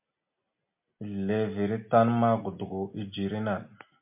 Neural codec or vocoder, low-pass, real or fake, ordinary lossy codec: none; 3.6 kHz; real; MP3, 32 kbps